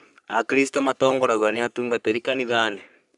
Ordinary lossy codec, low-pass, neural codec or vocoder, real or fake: none; 10.8 kHz; codec, 44.1 kHz, 3.4 kbps, Pupu-Codec; fake